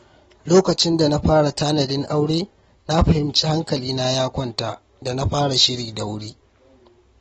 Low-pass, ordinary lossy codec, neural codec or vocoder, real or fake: 19.8 kHz; AAC, 24 kbps; none; real